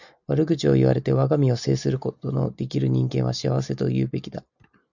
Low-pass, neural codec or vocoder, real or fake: 7.2 kHz; none; real